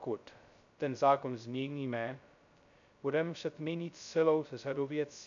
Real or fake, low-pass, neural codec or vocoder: fake; 7.2 kHz; codec, 16 kHz, 0.2 kbps, FocalCodec